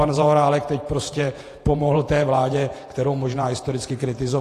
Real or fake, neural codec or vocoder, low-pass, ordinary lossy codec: fake; vocoder, 48 kHz, 128 mel bands, Vocos; 14.4 kHz; AAC, 48 kbps